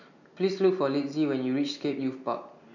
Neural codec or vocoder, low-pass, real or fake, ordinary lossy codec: none; 7.2 kHz; real; none